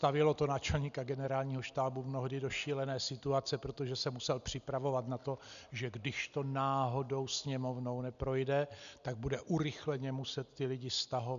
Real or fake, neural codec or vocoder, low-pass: real; none; 7.2 kHz